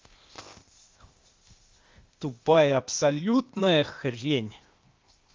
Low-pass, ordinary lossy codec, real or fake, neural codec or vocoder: 7.2 kHz; Opus, 32 kbps; fake; codec, 16 kHz, 0.8 kbps, ZipCodec